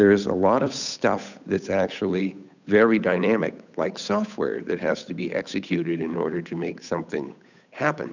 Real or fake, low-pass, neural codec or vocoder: fake; 7.2 kHz; codec, 16 kHz, 8 kbps, FunCodec, trained on Chinese and English, 25 frames a second